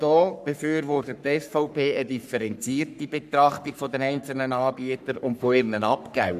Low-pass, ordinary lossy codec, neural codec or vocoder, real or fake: 14.4 kHz; none; codec, 44.1 kHz, 3.4 kbps, Pupu-Codec; fake